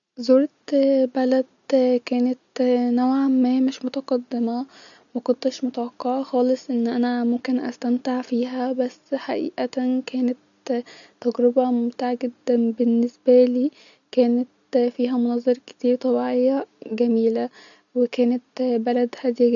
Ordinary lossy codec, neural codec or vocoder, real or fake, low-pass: none; none; real; 7.2 kHz